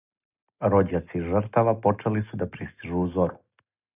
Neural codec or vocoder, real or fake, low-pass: none; real; 3.6 kHz